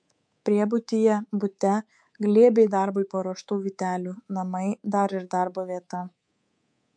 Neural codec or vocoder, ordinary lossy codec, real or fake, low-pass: codec, 24 kHz, 3.1 kbps, DualCodec; MP3, 64 kbps; fake; 9.9 kHz